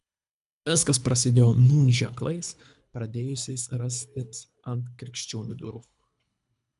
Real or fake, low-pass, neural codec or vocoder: fake; 10.8 kHz; codec, 24 kHz, 3 kbps, HILCodec